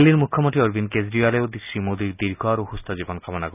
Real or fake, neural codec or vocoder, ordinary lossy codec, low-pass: real; none; none; 3.6 kHz